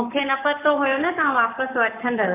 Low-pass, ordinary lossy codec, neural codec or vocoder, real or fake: 3.6 kHz; MP3, 32 kbps; codec, 44.1 kHz, 7.8 kbps, Pupu-Codec; fake